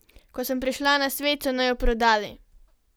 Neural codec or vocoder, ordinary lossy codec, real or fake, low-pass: none; none; real; none